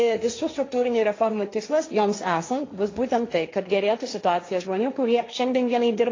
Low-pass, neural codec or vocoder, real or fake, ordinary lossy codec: 7.2 kHz; codec, 16 kHz, 1.1 kbps, Voila-Tokenizer; fake; AAC, 32 kbps